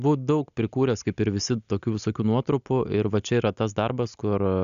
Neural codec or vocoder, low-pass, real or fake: none; 7.2 kHz; real